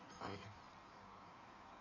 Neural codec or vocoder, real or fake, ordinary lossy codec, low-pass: codec, 16 kHz in and 24 kHz out, 1.1 kbps, FireRedTTS-2 codec; fake; none; 7.2 kHz